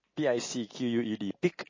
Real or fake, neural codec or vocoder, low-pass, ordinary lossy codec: real; none; 7.2 kHz; MP3, 32 kbps